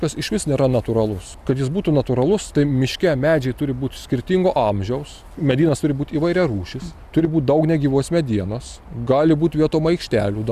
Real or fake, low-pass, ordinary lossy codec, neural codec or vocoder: real; 14.4 kHz; Opus, 64 kbps; none